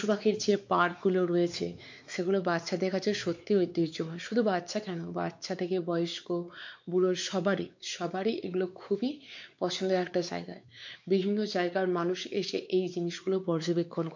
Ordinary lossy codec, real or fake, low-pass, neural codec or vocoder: MP3, 64 kbps; fake; 7.2 kHz; codec, 16 kHz, 4 kbps, X-Codec, WavLM features, trained on Multilingual LibriSpeech